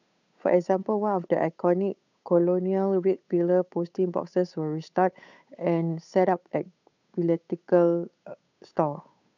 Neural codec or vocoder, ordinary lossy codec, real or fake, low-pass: codec, 16 kHz, 8 kbps, FunCodec, trained on Chinese and English, 25 frames a second; none; fake; 7.2 kHz